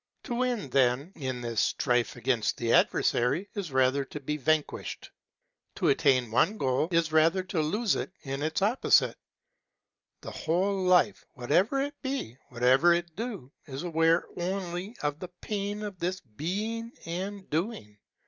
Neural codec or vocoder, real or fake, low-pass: none; real; 7.2 kHz